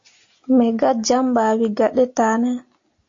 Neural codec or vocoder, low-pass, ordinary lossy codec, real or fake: none; 7.2 kHz; AAC, 48 kbps; real